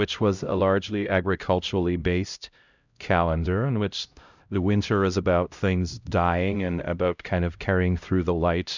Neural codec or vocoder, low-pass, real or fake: codec, 16 kHz, 0.5 kbps, X-Codec, HuBERT features, trained on LibriSpeech; 7.2 kHz; fake